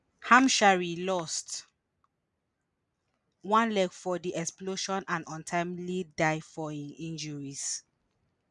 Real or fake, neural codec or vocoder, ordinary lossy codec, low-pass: real; none; none; 10.8 kHz